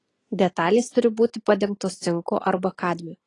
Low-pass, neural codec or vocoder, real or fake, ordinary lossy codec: 10.8 kHz; none; real; AAC, 32 kbps